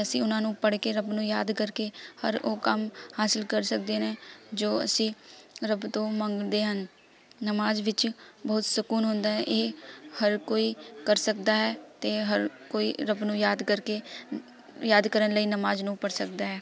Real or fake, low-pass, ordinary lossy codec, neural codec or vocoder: real; none; none; none